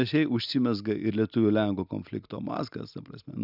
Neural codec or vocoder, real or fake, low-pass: none; real; 5.4 kHz